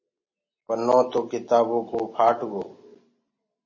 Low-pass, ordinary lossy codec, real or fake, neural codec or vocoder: 7.2 kHz; MP3, 32 kbps; real; none